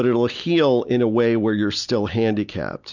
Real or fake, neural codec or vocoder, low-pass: real; none; 7.2 kHz